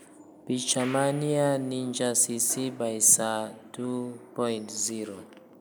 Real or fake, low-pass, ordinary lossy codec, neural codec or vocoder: real; none; none; none